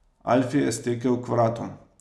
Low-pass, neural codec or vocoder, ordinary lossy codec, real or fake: none; none; none; real